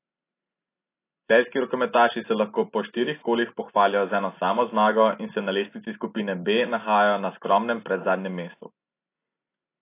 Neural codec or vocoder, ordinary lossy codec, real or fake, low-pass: none; AAC, 24 kbps; real; 3.6 kHz